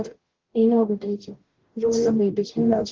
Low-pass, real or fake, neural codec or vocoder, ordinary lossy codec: 7.2 kHz; fake; codec, 44.1 kHz, 0.9 kbps, DAC; Opus, 16 kbps